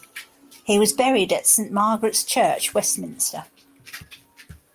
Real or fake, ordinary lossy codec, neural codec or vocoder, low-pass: real; Opus, 32 kbps; none; 14.4 kHz